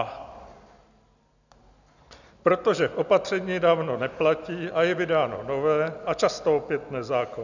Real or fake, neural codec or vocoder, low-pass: real; none; 7.2 kHz